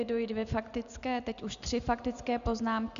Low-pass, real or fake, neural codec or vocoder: 7.2 kHz; real; none